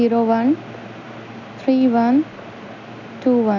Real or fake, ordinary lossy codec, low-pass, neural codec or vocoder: real; none; 7.2 kHz; none